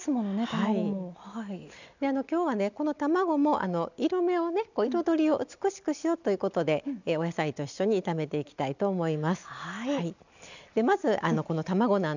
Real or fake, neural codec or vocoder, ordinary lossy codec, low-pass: real; none; none; 7.2 kHz